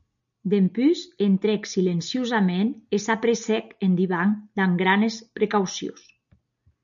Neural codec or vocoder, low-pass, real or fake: none; 7.2 kHz; real